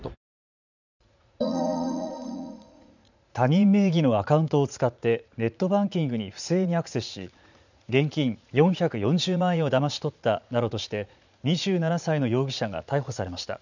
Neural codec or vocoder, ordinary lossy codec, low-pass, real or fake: vocoder, 44.1 kHz, 80 mel bands, Vocos; none; 7.2 kHz; fake